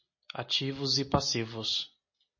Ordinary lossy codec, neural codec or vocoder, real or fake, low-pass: MP3, 32 kbps; none; real; 7.2 kHz